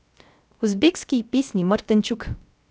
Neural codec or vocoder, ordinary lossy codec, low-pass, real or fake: codec, 16 kHz, 0.3 kbps, FocalCodec; none; none; fake